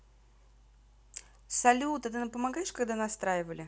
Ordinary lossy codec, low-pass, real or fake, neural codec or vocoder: none; none; real; none